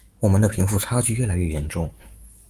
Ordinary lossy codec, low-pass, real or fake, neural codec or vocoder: Opus, 32 kbps; 14.4 kHz; fake; autoencoder, 48 kHz, 128 numbers a frame, DAC-VAE, trained on Japanese speech